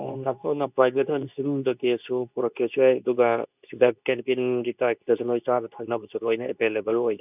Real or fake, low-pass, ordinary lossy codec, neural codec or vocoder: fake; 3.6 kHz; none; codec, 24 kHz, 0.9 kbps, WavTokenizer, medium speech release version 2